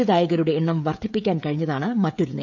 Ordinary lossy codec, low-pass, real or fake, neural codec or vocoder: none; 7.2 kHz; fake; codec, 16 kHz, 16 kbps, FreqCodec, smaller model